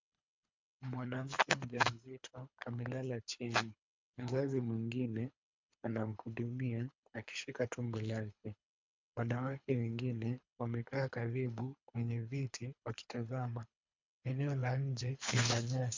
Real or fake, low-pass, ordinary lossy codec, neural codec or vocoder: fake; 7.2 kHz; MP3, 64 kbps; codec, 24 kHz, 3 kbps, HILCodec